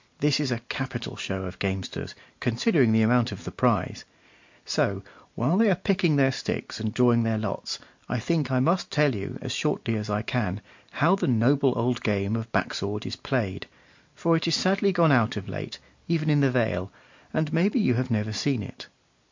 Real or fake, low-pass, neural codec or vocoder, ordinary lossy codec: real; 7.2 kHz; none; MP3, 48 kbps